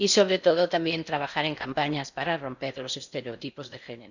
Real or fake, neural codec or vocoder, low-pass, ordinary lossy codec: fake; codec, 16 kHz in and 24 kHz out, 0.6 kbps, FocalCodec, streaming, 4096 codes; 7.2 kHz; none